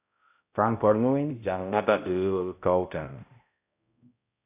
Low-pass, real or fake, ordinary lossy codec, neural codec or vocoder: 3.6 kHz; fake; AAC, 32 kbps; codec, 16 kHz, 0.5 kbps, X-Codec, HuBERT features, trained on balanced general audio